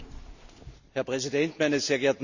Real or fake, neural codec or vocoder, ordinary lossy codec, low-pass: real; none; none; 7.2 kHz